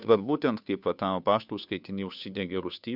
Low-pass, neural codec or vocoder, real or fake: 5.4 kHz; codec, 16 kHz, 2 kbps, FunCodec, trained on Chinese and English, 25 frames a second; fake